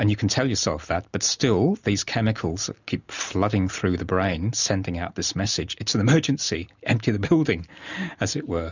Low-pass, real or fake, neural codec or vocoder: 7.2 kHz; real; none